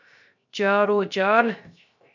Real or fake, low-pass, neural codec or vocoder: fake; 7.2 kHz; codec, 16 kHz, 0.3 kbps, FocalCodec